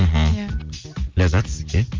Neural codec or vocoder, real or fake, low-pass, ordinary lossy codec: none; real; 7.2 kHz; Opus, 24 kbps